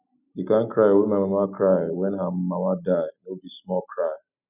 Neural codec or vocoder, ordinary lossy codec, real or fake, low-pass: none; none; real; 3.6 kHz